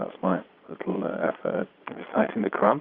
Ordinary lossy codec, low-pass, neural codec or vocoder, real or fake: Opus, 64 kbps; 5.4 kHz; vocoder, 22.05 kHz, 80 mel bands, Vocos; fake